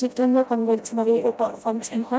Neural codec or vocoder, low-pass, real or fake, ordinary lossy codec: codec, 16 kHz, 0.5 kbps, FreqCodec, smaller model; none; fake; none